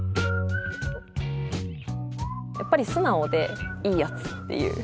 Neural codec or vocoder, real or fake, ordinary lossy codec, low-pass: none; real; none; none